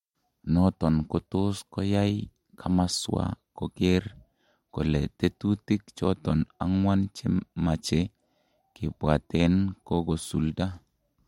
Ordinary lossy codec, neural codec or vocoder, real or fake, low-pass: MP3, 64 kbps; none; real; 19.8 kHz